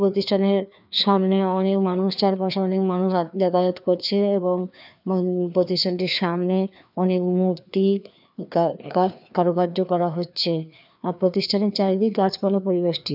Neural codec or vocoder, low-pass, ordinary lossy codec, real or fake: codec, 16 kHz, 2 kbps, FreqCodec, larger model; 5.4 kHz; none; fake